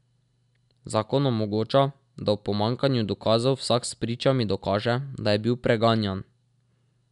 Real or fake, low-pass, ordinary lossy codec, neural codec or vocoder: real; 10.8 kHz; none; none